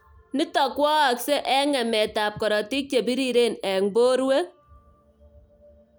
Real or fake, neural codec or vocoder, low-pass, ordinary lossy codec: real; none; none; none